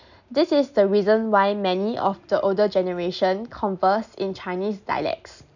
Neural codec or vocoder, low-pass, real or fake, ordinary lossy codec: none; 7.2 kHz; real; none